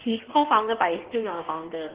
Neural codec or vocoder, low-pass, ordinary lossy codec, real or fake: codec, 16 kHz in and 24 kHz out, 1.1 kbps, FireRedTTS-2 codec; 3.6 kHz; Opus, 16 kbps; fake